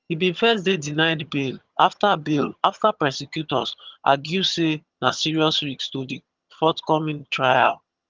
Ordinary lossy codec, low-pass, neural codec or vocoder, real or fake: Opus, 32 kbps; 7.2 kHz; vocoder, 22.05 kHz, 80 mel bands, HiFi-GAN; fake